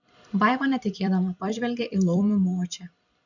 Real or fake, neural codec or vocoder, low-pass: fake; vocoder, 44.1 kHz, 128 mel bands every 256 samples, BigVGAN v2; 7.2 kHz